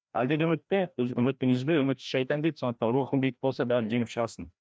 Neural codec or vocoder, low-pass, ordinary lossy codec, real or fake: codec, 16 kHz, 1 kbps, FreqCodec, larger model; none; none; fake